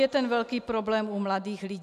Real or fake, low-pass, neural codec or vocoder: real; 14.4 kHz; none